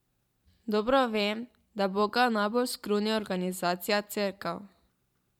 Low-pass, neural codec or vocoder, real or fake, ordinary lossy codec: 19.8 kHz; vocoder, 44.1 kHz, 128 mel bands every 512 samples, BigVGAN v2; fake; MP3, 96 kbps